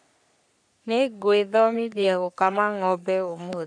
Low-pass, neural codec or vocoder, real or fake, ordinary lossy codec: 9.9 kHz; codec, 44.1 kHz, 3.4 kbps, Pupu-Codec; fake; none